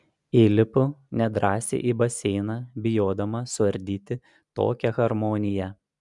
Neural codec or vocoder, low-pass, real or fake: none; 10.8 kHz; real